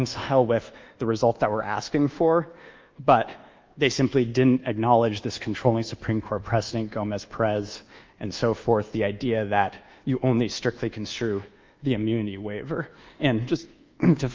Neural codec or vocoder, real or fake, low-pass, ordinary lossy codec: codec, 24 kHz, 1.2 kbps, DualCodec; fake; 7.2 kHz; Opus, 32 kbps